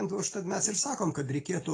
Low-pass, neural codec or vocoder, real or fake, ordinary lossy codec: 9.9 kHz; none; real; AAC, 32 kbps